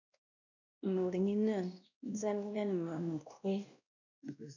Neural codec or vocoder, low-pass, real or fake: codec, 16 kHz, 1 kbps, X-Codec, WavLM features, trained on Multilingual LibriSpeech; 7.2 kHz; fake